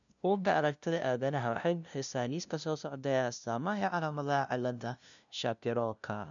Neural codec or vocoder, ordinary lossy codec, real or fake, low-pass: codec, 16 kHz, 0.5 kbps, FunCodec, trained on LibriTTS, 25 frames a second; none; fake; 7.2 kHz